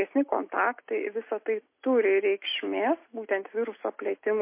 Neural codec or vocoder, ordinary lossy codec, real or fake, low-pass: none; MP3, 24 kbps; real; 3.6 kHz